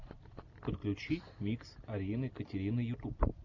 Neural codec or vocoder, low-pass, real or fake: none; 7.2 kHz; real